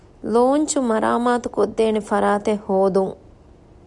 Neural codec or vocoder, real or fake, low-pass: none; real; 10.8 kHz